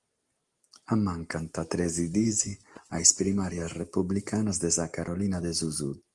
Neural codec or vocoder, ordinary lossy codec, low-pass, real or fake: none; Opus, 24 kbps; 10.8 kHz; real